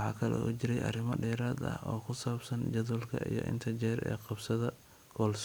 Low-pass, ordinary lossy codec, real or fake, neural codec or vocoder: none; none; real; none